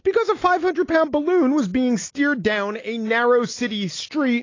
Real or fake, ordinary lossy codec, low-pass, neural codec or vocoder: real; AAC, 32 kbps; 7.2 kHz; none